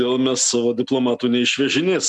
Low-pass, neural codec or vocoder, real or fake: 10.8 kHz; none; real